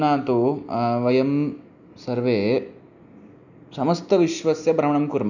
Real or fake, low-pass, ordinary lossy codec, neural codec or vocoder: real; 7.2 kHz; none; none